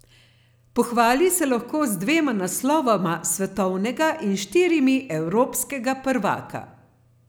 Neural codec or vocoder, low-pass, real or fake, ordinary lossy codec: none; none; real; none